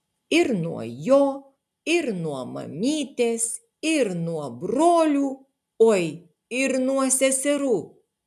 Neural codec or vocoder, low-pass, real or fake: none; 14.4 kHz; real